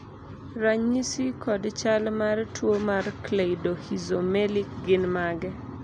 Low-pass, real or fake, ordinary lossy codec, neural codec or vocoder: 9.9 kHz; real; none; none